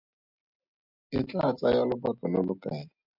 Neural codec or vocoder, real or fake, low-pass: none; real; 5.4 kHz